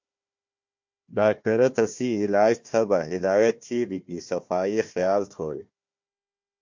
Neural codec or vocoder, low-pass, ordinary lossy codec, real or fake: codec, 16 kHz, 1 kbps, FunCodec, trained on Chinese and English, 50 frames a second; 7.2 kHz; MP3, 48 kbps; fake